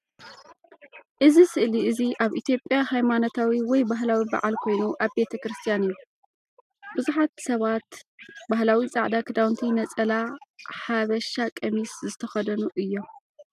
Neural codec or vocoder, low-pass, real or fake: none; 14.4 kHz; real